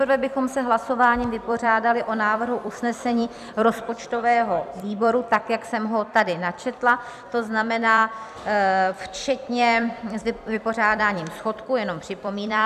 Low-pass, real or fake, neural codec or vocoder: 14.4 kHz; fake; vocoder, 44.1 kHz, 128 mel bands every 256 samples, BigVGAN v2